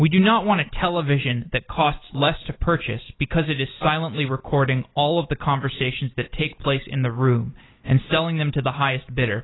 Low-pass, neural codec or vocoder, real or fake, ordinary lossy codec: 7.2 kHz; none; real; AAC, 16 kbps